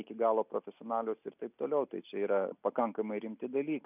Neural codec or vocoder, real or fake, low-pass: none; real; 3.6 kHz